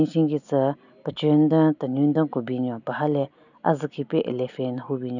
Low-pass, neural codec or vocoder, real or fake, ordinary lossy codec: 7.2 kHz; none; real; none